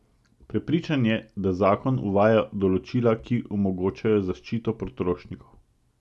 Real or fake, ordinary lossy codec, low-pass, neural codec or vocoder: real; none; none; none